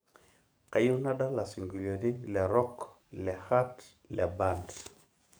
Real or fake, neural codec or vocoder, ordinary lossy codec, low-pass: fake; codec, 44.1 kHz, 7.8 kbps, DAC; none; none